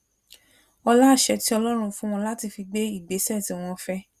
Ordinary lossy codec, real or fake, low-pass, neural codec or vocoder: Opus, 64 kbps; real; 14.4 kHz; none